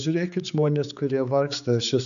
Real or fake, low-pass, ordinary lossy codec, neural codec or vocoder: fake; 7.2 kHz; MP3, 96 kbps; codec, 16 kHz, 4 kbps, X-Codec, HuBERT features, trained on general audio